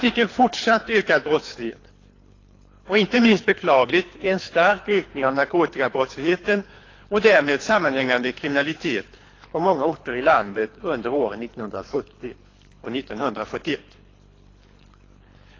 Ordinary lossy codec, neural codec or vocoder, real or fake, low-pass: AAC, 32 kbps; codec, 24 kHz, 3 kbps, HILCodec; fake; 7.2 kHz